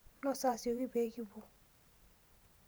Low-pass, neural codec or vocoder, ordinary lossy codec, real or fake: none; vocoder, 44.1 kHz, 128 mel bands every 512 samples, BigVGAN v2; none; fake